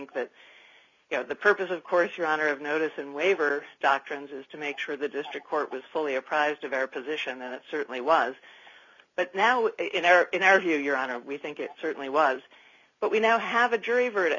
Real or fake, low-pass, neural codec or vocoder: real; 7.2 kHz; none